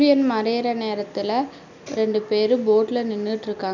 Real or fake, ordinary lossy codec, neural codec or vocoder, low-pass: real; none; none; 7.2 kHz